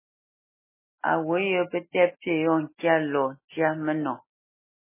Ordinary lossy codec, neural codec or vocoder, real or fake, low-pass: MP3, 16 kbps; none; real; 3.6 kHz